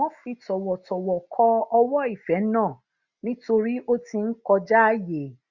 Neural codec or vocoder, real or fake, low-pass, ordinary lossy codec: none; real; 7.2 kHz; Opus, 64 kbps